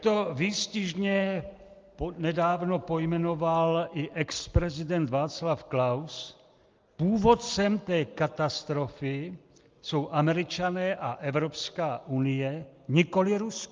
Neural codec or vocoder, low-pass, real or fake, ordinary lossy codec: none; 7.2 kHz; real; Opus, 24 kbps